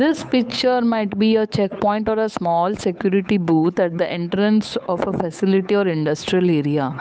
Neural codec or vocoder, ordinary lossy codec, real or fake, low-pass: codec, 16 kHz, 8 kbps, FunCodec, trained on Chinese and English, 25 frames a second; none; fake; none